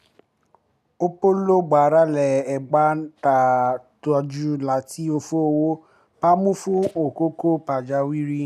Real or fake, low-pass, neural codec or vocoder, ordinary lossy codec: real; 14.4 kHz; none; none